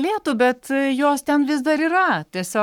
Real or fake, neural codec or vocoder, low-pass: fake; codec, 44.1 kHz, 7.8 kbps, Pupu-Codec; 19.8 kHz